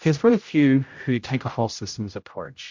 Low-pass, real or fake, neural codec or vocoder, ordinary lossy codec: 7.2 kHz; fake; codec, 16 kHz, 0.5 kbps, X-Codec, HuBERT features, trained on general audio; MP3, 48 kbps